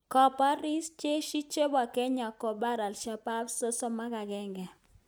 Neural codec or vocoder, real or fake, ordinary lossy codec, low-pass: none; real; none; none